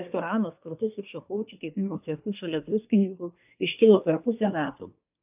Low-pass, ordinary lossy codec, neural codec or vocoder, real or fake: 3.6 kHz; AAC, 32 kbps; codec, 24 kHz, 1 kbps, SNAC; fake